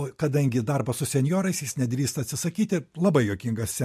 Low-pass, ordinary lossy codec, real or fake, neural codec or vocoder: 14.4 kHz; MP3, 64 kbps; real; none